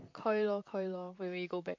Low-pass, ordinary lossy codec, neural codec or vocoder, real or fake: 7.2 kHz; MP3, 48 kbps; vocoder, 44.1 kHz, 128 mel bands, Pupu-Vocoder; fake